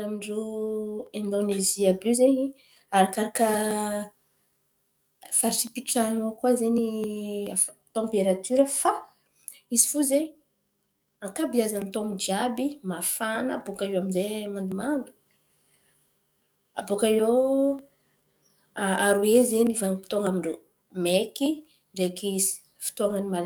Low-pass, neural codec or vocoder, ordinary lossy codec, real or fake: none; codec, 44.1 kHz, 7.8 kbps, DAC; none; fake